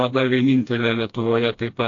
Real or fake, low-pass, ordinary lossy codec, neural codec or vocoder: fake; 7.2 kHz; AAC, 48 kbps; codec, 16 kHz, 1 kbps, FreqCodec, smaller model